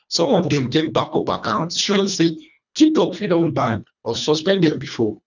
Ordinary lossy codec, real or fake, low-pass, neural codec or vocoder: none; fake; 7.2 kHz; codec, 24 kHz, 1.5 kbps, HILCodec